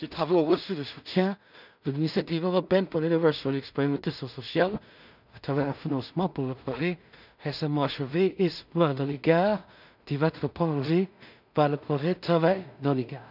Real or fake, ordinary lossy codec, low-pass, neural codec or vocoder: fake; none; 5.4 kHz; codec, 16 kHz in and 24 kHz out, 0.4 kbps, LongCat-Audio-Codec, two codebook decoder